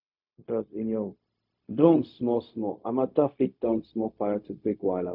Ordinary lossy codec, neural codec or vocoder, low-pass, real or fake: none; codec, 16 kHz, 0.4 kbps, LongCat-Audio-Codec; 5.4 kHz; fake